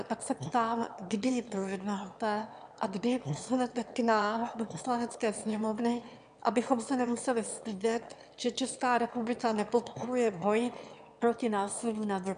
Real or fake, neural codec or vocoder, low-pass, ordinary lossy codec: fake; autoencoder, 22.05 kHz, a latent of 192 numbers a frame, VITS, trained on one speaker; 9.9 kHz; AAC, 96 kbps